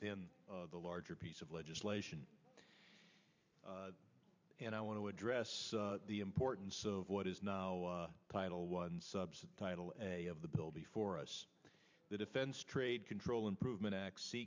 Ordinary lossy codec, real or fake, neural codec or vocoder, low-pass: MP3, 64 kbps; fake; vocoder, 44.1 kHz, 128 mel bands every 512 samples, BigVGAN v2; 7.2 kHz